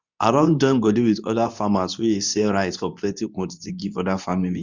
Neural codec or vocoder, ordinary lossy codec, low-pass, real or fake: codec, 24 kHz, 0.9 kbps, WavTokenizer, medium speech release version 2; Opus, 64 kbps; 7.2 kHz; fake